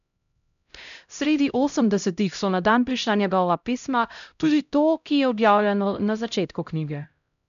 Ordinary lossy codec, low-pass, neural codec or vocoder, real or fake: none; 7.2 kHz; codec, 16 kHz, 0.5 kbps, X-Codec, HuBERT features, trained on LibriSpeech; fake